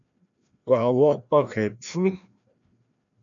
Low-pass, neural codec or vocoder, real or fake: 7.2 kHz; codec, 16 kHz, 1 kbps, FreqCodec, larger model; fake